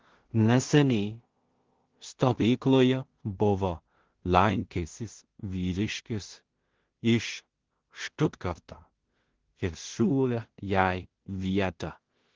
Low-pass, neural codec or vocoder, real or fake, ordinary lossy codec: 7.2 kHz; codec, 16 kHz in and 24 kHz out, 0.4 kbps, LongCat-Audio-Codec, two codebook decoder; fake; Opus, 16 kbps